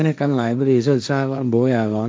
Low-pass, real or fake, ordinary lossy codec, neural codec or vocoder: none; fake; none; codec, 16 kHz, 1.1 kbps, Voila-Tokenizer